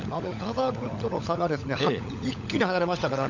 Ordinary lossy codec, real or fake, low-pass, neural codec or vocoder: none; fake; 7.2 kHz; codec, 16 kHz, 16 kbps, FunCodec, trained on LibriTTS, 50 frames a second